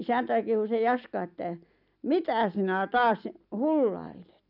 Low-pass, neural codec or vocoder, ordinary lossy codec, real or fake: 5.4 kHz; none; none; real